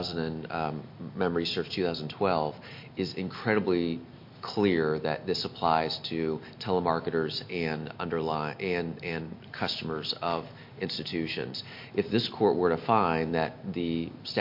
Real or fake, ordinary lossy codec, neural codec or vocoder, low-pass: real; MP3, 32 kbps; none; 5.4 kHz